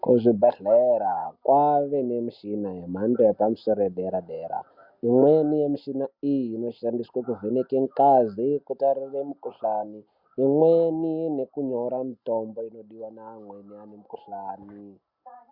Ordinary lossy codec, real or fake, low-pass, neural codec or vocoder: MP3, 48 kbps; real; 5.4 kHz; none